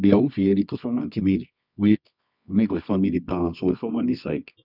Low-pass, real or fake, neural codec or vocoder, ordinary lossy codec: 5.4 kHz; fake; codec, 24 kHz, 0.9 kbps, WavTokenizer, medium music audio release; none